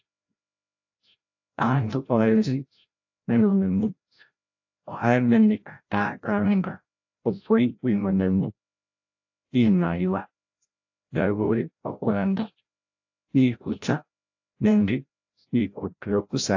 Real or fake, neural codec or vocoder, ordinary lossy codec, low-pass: fake; codec, 16 kHz, 0.5 kbps, FreqCodec, larger model; AAC, 48 kbps; 7.2 kHz